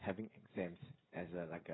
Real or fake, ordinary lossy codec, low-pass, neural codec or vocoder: fake; AAC, 16 kbps; 7.2 kHz; codec, 16 kHz, 16 kbps, FreqCodec, smaller model